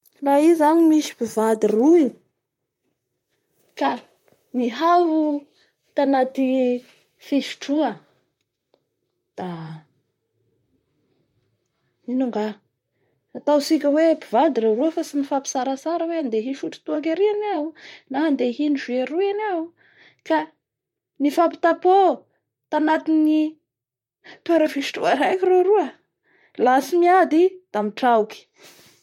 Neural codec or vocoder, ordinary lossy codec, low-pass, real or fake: vocoder, 44.1 kHz, 128 mel bands, Pupu-Vocoder; MP3, 64 kbps; 19.8 kHz; fake